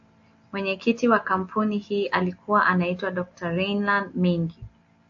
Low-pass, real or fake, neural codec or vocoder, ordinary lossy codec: 7.2 kHz; real; none; AAC, 48 kbps